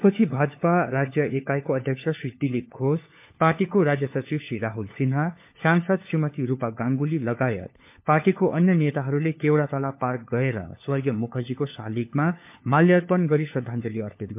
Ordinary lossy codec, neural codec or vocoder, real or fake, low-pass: MP3, 32 kbps; codec, 16 kHz, 4 kbps, FunCodec, trained on LibriTTS, 50 frames a second; fake; 3.6 kHz